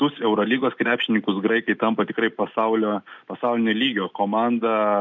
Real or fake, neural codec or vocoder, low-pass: real; none; 7.2 kHz